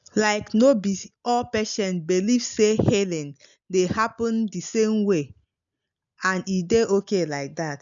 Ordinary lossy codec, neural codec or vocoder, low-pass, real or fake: none; none; 7.2 kHz; real